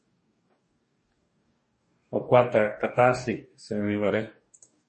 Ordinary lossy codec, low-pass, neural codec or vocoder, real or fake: MP3, 32 kbps; 10.8 kHz; codec, 44.1 kHz, 2.6 kbps, DAC; fake